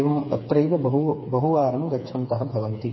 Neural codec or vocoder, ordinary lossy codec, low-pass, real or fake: codec, 16 kHz, 4 kbps, FreqCodec, smaller model; MP3, 24 kbps; 7.2 kHz; fake